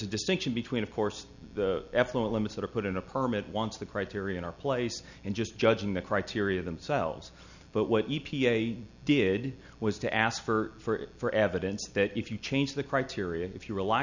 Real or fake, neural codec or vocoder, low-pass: real; none; 7.2 kHz